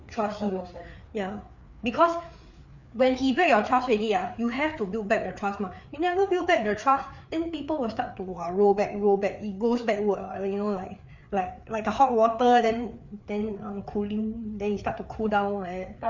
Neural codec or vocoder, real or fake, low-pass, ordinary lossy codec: codec, 16 kHz, 4 kbps, FreqCodec, larger model; fake; 7.2 kHz; none